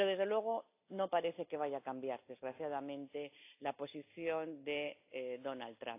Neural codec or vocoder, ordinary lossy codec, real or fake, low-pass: none; AAC, 24 kbps; real; 3.6 kHz